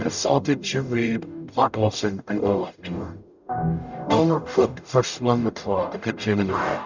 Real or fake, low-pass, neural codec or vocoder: fake; 7.2 kHz; codec, 44.1 kHz, 0.9 kbps, DAC